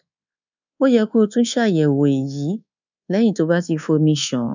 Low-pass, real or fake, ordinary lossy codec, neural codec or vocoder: 7.2 kHz; fake; none; codec, 24 kHz, 1.2 kbps, DualCodec